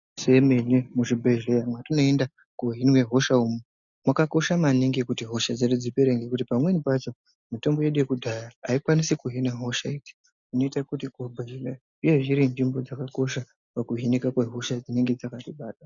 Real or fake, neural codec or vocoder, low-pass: real; none; 7.2 kHz